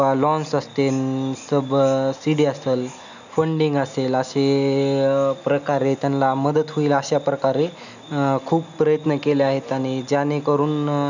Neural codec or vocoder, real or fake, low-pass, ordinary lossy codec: none; real; 7.2 kHz; none